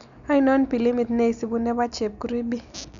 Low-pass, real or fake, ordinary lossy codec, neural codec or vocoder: 7.2 kHz; real; none; none